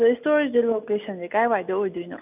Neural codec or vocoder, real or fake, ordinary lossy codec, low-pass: none; real; none; 3.6 kHz